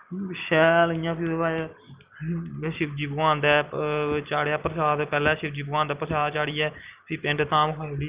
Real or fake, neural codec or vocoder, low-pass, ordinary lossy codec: real; none; 3.6 kHz; Opus, 32 kbps